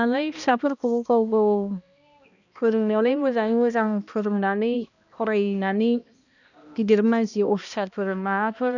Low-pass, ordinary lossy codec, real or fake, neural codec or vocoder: 7.2 kHz; none; fake; codec, 16 kHz, 1 kbps, X-Codec, HuBERT features, trained on balanced general audio